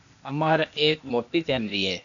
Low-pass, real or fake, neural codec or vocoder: 7.2 kHz; fake; codec, 16 kHz, 0.8 kbps, ZipCodec